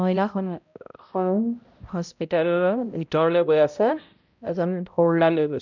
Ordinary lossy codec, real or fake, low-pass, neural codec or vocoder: none; fake; 7.2 kHz; codec, 16 kHz, 0.5 kbps, X-Codec, HuBERT features, trained on balanced general audio